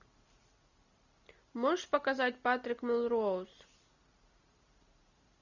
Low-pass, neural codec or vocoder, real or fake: 7.2 kHz; none; real